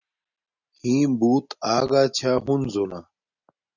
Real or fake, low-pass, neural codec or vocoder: real; 7.2 kHz; none